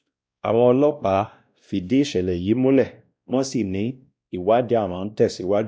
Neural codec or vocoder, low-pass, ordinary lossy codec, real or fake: codec, 16 kHz, 1 kbps, X-Codec, WavLM features, trained on Multilingual LibriSpeech; none; none; fake